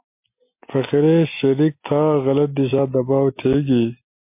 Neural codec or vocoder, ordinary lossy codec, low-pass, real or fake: none; MP3, 24 kbps; 3.6 kHz; real